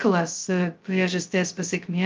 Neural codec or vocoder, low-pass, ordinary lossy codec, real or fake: codec, 16 kHz, 0.2 kbps, FocalCodec; 7.2 kHz; Opus, 32 kbps; fake